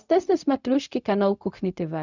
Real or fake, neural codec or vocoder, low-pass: fake; codec, 16 kHz, 0.4 kbps, LongCat-Audio-Codec; 7.2 kHz